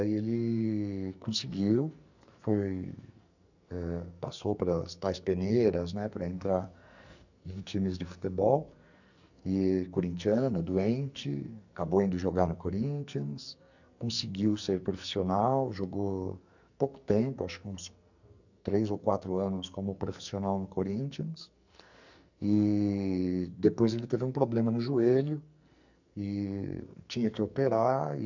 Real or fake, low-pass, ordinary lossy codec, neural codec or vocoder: fake; 7.2 kHz; none; codec, 44.1 kHz, 2.6 kbps, SNAC